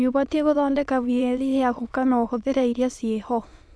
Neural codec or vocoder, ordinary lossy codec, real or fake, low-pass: autoencoder, 22.05 kHz, a latent of 192 numbers a frame, VITS, trained on many speakers; none; fake; none